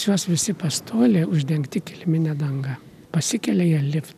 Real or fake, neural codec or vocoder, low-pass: real; none; 14.4 kHz